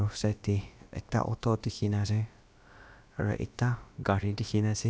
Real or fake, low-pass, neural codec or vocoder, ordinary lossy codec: fake; none; codec, 16 kHz, about 1 kbps, DyCAST, with the encoder's durations; none